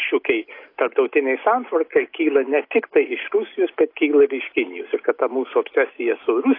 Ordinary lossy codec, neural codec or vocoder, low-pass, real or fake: AAC, 32 kbps; none; 5.4 kHz; real